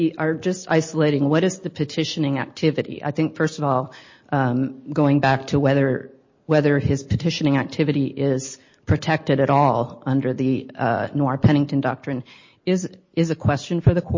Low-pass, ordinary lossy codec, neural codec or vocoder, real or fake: 7.2 kHz; MP3, 32 kbps; none; real